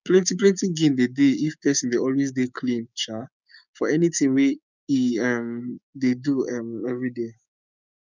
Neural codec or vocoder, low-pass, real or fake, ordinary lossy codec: codec, 16 kHz, 6 kbps, DAC; 7.2 kHz; fake; none